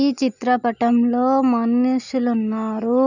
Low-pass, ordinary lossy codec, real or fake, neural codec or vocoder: 7.2 kHz; none; real; none